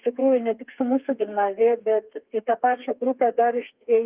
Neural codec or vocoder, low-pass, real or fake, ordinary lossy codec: codec, 32 kHz, 1.9 kbps, SNAC; 3.6 kHz; fake; Opus, 32 kbps